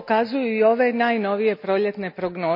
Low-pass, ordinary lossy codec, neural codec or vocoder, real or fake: 5.4 kHz; none; none; real